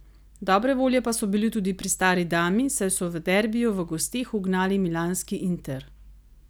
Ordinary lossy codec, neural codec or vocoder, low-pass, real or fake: none; none; none; real